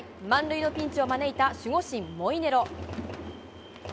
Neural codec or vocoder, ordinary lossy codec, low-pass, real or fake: none; none; none; real